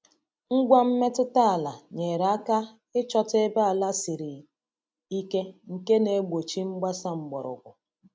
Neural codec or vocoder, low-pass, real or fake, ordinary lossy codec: none; none; real; none